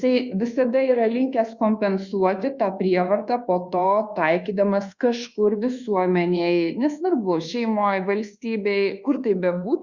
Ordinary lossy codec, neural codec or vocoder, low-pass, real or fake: Opus, 64 kbps; codec, 24 kHz, 1.2 kbps, DualCodec; 7.2 kHz; fake